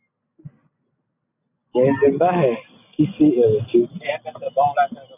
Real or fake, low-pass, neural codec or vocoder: real; 3.6 kHz; none